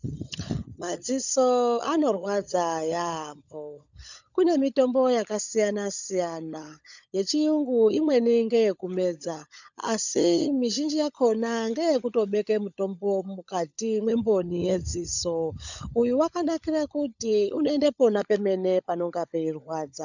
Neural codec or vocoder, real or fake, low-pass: codec, 16 kHz, 16 kbps, FunCodec, trained on LibriTTS, 50 frames a second; fake; 7.2 kHz